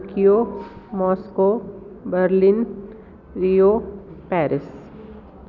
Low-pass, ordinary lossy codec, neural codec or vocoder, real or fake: 7.2 kHz; none; none; real